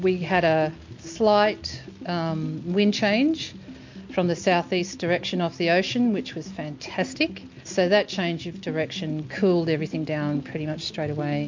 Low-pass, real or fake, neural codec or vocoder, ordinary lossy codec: 7.2 kHz; real; none; MP3, 48 kbps